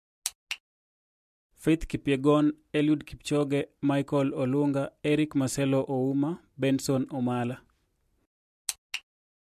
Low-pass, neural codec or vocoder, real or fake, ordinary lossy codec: 14.4 kHz; none; real; MP3, 64 kbps